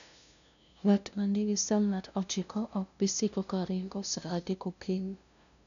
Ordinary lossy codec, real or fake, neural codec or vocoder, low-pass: none; fake; codec, 16 kHz, 0.5 kbps, FunCodec, trained on LibriTTS, 25 frames a second; 7.2 kHz